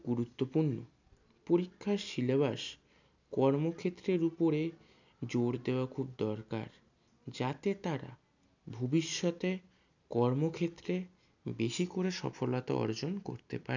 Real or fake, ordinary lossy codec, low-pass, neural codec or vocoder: real; none; 7.2 kHz; none